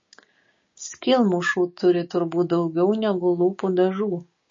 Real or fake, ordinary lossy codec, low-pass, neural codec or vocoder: fake; MP3, 32 kbps; 7.2 kHz; codec, 16 kHz, 6 kbps, DAC